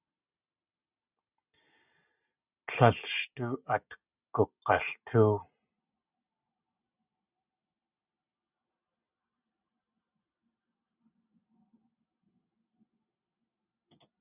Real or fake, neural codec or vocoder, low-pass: real; none; 3.6 kHz